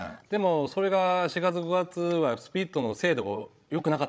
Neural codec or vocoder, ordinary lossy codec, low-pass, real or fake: codec, 16 kHz, 16 kbps, FreqCodec, larger model; none; none; fake